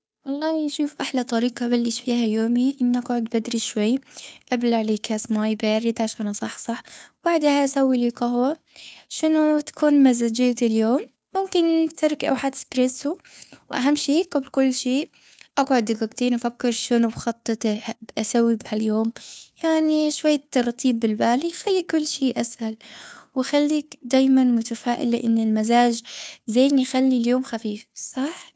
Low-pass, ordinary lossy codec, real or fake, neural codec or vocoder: none; none; fake; codec, 16 kHz, 2 kbps, FunCodec, trained on Chinese and English, 25 frames a second